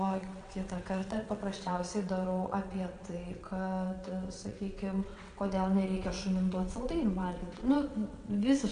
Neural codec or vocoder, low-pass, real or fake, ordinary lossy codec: vocoder, 22.05 kHz, 80 mel bands, WaveNeXt; 9.9 kHz; fake; AAC, 96 kbps